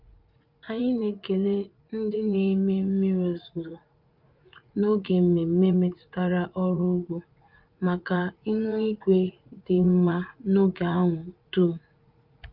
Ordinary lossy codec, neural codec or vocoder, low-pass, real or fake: Opus, 32 kbps; vocoder, 44.1 kHz, 80 mel bands, Vocos; 5.4 kHz; fake